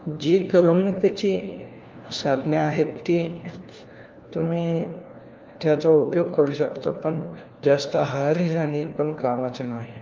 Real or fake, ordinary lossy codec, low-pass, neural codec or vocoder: fake; Opus, 32 kbps; 7.2 kHz; codec, 16 kHz, 1 kbps, FunCodec, trained on LibriTTS, 50 frames a second